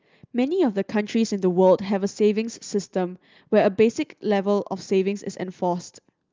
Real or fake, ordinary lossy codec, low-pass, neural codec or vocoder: real; Opus, 24 kbps; 7.2 kHz; none